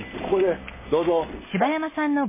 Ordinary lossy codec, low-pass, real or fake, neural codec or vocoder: MP3, 16 kbps; 3.6 kHz; real; none